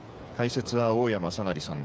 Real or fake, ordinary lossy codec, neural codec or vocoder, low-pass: fake; none; codec, 16 kHz, 8 kbps, FreqCodec, smaller model; none